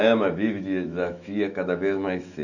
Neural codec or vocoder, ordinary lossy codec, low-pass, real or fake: autoencoder, 48 kHz, 128 numbers a frame, DAC-VAE, trained on Japanese speech; none; 7.2 kHz; fake